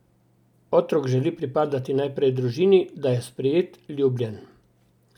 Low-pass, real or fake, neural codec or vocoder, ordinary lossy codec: 19.8 kHz; real; none; none